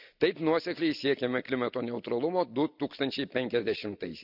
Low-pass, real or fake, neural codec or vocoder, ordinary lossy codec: 5.4 kHz; real; none; none